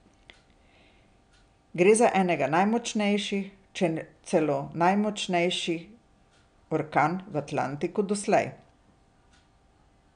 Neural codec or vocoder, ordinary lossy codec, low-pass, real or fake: none; none; 9.9 kHz; real